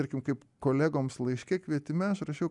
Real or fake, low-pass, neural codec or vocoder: real; 10.8 kHz; none